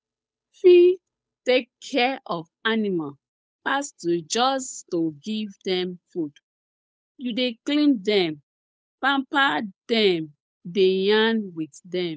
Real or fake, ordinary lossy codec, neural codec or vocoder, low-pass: fake; none; codec, 16 kHz, 8 kbps, FunCodec, trained on Chinese and English, 25 frames a second; none